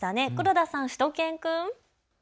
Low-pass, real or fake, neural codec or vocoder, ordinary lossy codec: none; real; none; none